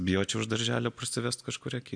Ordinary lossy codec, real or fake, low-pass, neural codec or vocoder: MP3, 64 kbps; real; 9.9 kHz; none